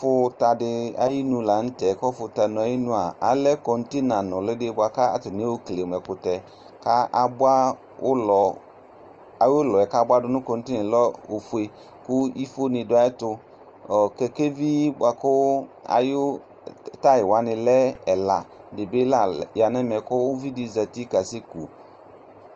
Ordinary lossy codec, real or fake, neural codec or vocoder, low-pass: Opus, 24 kbps; real; none; 7.2 kHz